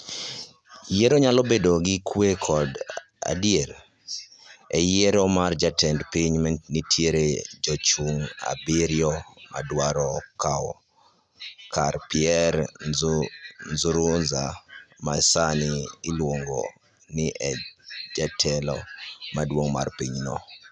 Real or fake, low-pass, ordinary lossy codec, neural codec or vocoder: real; none; none; none